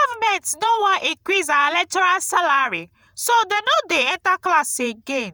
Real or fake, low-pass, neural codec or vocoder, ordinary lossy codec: fake; none; vocoder, 48 kHz, 128 mel bands, Vocos; none